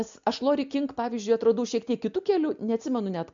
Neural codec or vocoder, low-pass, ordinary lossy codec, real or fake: none; 7.2 kHz; MP3, 64 kbps; real